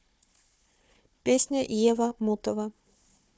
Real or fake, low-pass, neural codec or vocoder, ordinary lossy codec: fake; none; codec, 16 kHz, 4 kbps, FunCodec, trained on Chinese and English, 50 frames a second; none